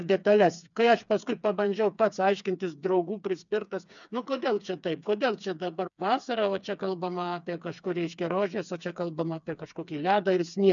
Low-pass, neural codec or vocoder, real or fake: 7.2 kHz; codec, 16 kHz, 4 kbps, FreqCodec, smaller model; fake